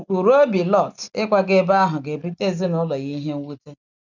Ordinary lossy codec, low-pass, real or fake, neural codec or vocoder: none; 7.2 kHz; real; none